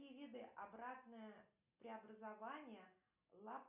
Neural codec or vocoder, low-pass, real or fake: none; 3.6 kHz; real